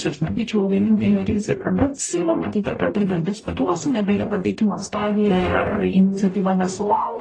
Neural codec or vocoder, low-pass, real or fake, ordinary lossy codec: codec, 44.1 kHz, 0.9 kbps, DAC; 9.9 kHz; fake; AAC, 32 kbps